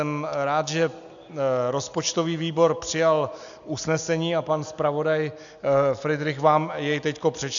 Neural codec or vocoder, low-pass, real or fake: none; 7.2 kHz; real